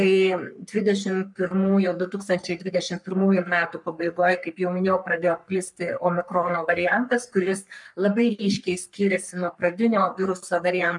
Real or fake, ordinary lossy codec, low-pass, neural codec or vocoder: fake; MP3, 96 kbps; 10.8 kHz; codec, 44.1 kHz, 3.4 kbps, Pupu-Codec